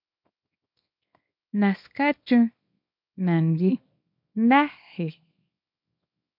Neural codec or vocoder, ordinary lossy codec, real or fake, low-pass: codec, 24 kHz, 0.9 kbps, WavTokenizer, small release; MP3, 48 kbps; fake; 5.4 kHz